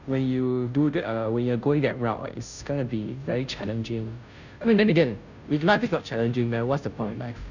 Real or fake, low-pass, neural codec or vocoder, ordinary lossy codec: fake; 7.2 kHz; codec, 16 kHz, 0.5 kbps, FunCodec, trained on Chinese and English, 25 frames a second; none